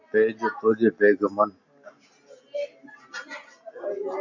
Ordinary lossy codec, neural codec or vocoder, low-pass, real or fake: AAC, 48 kbps; none; 7.2 kHz; real